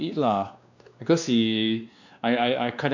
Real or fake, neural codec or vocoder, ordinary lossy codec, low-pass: fake; codec, 16 kHz, 2 kbps, X-Codec, WavLM features, trained on Multilingual LibriSpeech; none; 7.2 kHz